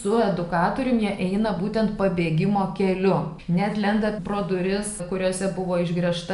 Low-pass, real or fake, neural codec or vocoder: 10.8 kHz; real; none